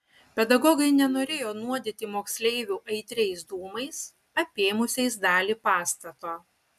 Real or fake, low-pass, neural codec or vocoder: real; 14.4 kHz; none